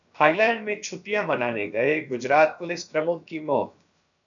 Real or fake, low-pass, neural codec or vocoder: fake; 7.2 kHz; codec, 16 kHz, about 1 kbps, DyCAST, with the encoder's durations